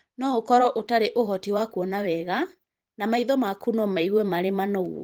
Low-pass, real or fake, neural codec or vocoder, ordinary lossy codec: 19.8 kHz; fake; vocoder, 44.1 kHz, 128 mel bands every 512 samples, BigVGAN v2; Opus, 24 kbps